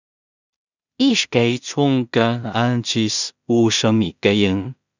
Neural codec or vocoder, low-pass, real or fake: codec, 16 kHz in and 24 kHz out, 0.4 kbps, LongCat-Audio-Codec, two codebook decoder; 7.2 kHz; fake